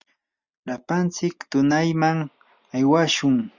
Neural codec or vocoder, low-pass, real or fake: none; 7.2 kHz; real